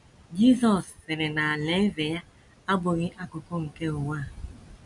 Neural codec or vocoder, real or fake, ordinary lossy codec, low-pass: none; real; MP3, 64 kbps; 10.8 kHz